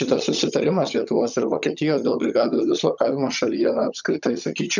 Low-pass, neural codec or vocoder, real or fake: 7.2 kHz; vocoder, 22.05 kHz, 80 mel bands, HiFi-GAN; fake